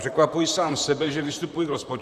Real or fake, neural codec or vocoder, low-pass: fake; vocoder, 44.1 kHz, 128 mel bands, Pupu-Vocoder; 14.4 kHz